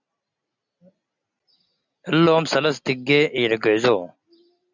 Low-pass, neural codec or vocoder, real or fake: 7.2 kHz; none; real